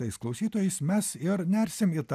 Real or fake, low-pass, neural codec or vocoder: real; 14.4 kHz; none